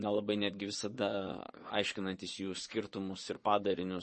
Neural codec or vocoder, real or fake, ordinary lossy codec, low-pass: vocoder, 22.05 kHz, 80 mel bands, Vocos; fake; MP3, 32 kbps; 9.9 kHz